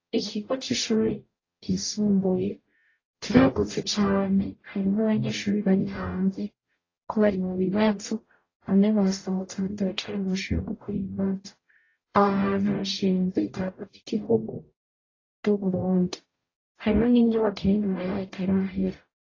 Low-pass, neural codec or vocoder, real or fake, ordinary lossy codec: 7.2 kHz; codec, 44.1 kHz, 0.9 kbps, DAC; fake; AAC, 32 kbps